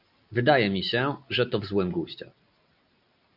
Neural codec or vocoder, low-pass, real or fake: none; 5.4 kHz; real